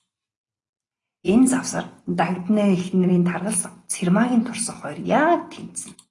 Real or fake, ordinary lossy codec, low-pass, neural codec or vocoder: real; AAC, 32 kbps; 10.8 kHz; none